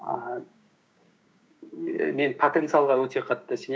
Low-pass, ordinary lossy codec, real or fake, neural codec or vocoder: none; none; fake; codec, 16 kHz, 6 kbps, DAC